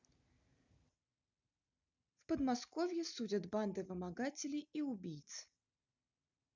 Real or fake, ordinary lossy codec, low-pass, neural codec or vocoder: real; none; 7.2 kHz; none